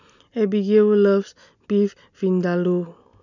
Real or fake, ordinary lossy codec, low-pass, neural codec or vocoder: real; none; 7.2 kHz; none